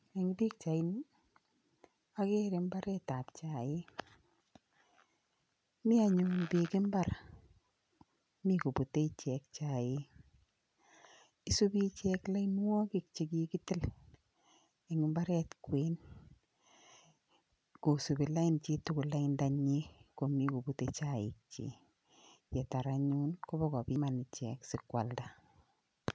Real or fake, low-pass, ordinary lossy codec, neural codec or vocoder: real; none; none; none